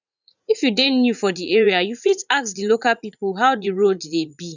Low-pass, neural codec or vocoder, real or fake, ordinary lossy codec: 7.2 kHz; vocoder, 44.1 kHz, 80 mel bands, Vocos; fake; none